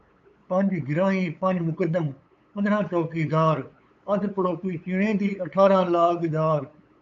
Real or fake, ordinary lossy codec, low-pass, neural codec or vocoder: fake; MP3, 64 kbps; 7.2 kHz; codec, 16 kHz, 8 kbps, FunCodec, trained on LibriTTS, 25 frames a second